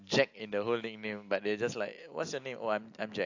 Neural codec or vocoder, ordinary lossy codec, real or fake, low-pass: none; AAC, 48 kbps; real; 7.2 kHz